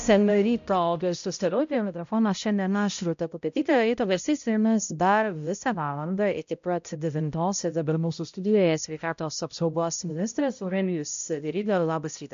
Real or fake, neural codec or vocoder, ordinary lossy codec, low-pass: fake; codec, 16 kHz, 0.5 kbps, X-Codec, HuBERT features, trained on balanced general audio; MP3, 64 kbps; 7.2 kHz